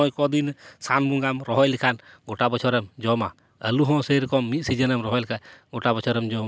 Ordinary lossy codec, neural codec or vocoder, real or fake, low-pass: none; none; real; none